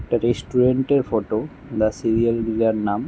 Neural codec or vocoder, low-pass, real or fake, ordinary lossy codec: none; none; real; none